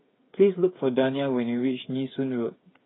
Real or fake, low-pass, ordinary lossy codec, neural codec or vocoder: fake; 7.2 kHz; AAC, 16 kbps; codec, 16 kHz, 8 kbps, FreqCodec, smaller model